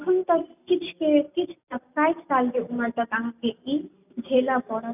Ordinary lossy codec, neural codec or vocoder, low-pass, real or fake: none; none; 3.6 kHz; real